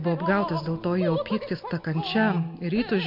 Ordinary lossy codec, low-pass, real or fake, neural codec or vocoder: MP3, 48 kbps; 5.4 kHz; real; none